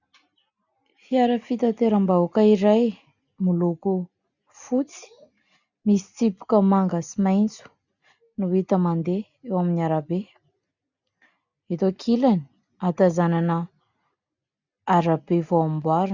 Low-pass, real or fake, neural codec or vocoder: 7.2 kHz; real; none